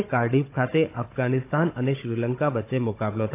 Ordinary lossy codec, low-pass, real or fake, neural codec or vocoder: AAC, 24 kbps; 3.6 kHz; fake; codec, 16 kHz, 16 kbps, FunCodec, trained on Chinese and English, 50 frames a second